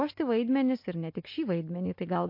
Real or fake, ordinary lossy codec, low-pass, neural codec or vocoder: real; MP3, 32 kbps; 5.4 kHz; none